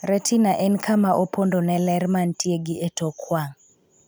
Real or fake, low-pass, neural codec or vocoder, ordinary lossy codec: real; none; none; none